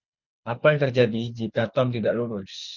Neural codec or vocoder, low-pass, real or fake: codec, 24 kHz, 6 kbps, HILCodec; 7.2 kHz; fake